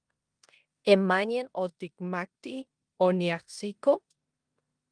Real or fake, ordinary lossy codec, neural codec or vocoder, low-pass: fake; Opus, 32 kbps; codec, 16 kHz in and 24 kHz out, 0.9 kbps, LongCat-Audio-Codec, four codebook decoder; 9.9 kHz